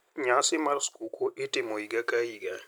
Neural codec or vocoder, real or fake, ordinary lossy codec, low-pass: none; real; none; none